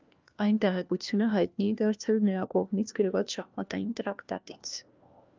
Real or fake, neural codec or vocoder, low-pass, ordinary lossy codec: fake; codec, 16 kHz, 1 kbps, FunCodec, trained on LibriTTS, 50 frames a second; 7.2 kHz; Opus, 24 kbps